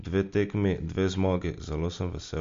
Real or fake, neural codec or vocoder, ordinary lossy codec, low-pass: real; none; MP3, 48 kbps; 7.2 kHz